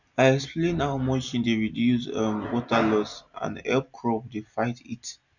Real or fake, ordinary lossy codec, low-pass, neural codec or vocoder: real; none; 7.2 kHz; none